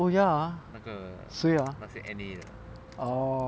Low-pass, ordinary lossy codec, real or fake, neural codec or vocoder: none; none; real; none